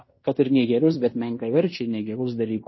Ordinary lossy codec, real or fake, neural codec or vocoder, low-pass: MP3, 24 kbps; fake; codec, 16 kHz in and 24 kHz out, 0.9 kbps, LongCat-Audio-Codec, fine tuned four codebook decoder; 7.2 kHz